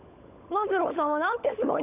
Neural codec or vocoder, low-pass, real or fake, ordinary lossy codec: codec, 16 kHz, 16 kbps, FunCodec, trained on LibriTTS, 50 frames a second; 3.6 kHz; fake; none